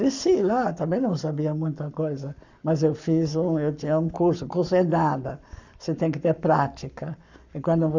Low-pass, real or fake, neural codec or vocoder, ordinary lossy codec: 7.2 kHz; fake; codec, 44.1 kHz, 7.8 kbps, Pupu-Codec; none